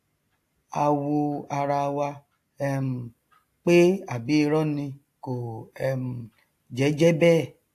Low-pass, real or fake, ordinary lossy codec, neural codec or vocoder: 14.4 kHz; real; AAC, 64 kbps; none